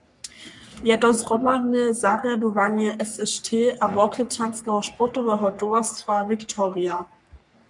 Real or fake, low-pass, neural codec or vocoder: fake; 10.8 kHz; codec, 44.1 kHz, 3.4 kbps, Pupu-Codec